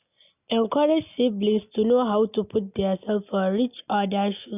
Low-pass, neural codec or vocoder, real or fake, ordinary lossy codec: 3.6 kHz; none; real; none